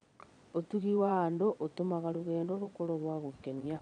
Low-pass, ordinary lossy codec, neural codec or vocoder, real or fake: 9.9 kHz; none; vocoder, 22.05 kHz, 80 mel bands, Vocos; fake